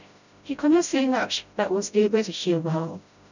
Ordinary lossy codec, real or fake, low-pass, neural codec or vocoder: none; fake; 7.2 kHz; codec, 16 kHz, 0.5 kbps, FreqCodec, smaller model